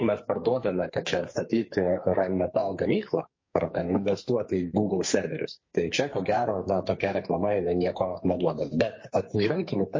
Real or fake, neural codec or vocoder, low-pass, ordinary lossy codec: fake; codec, 44.1 kHz, 2.6 kbps, SNAC; 7.2 kHz; MP3, 32 kbps